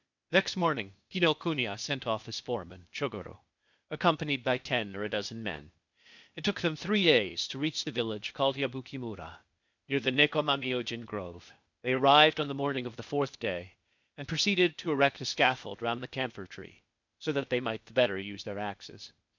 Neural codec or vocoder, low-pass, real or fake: codec, 16 kHz, 0.8 kbps, ZipCodec; 7.2 kHz; fake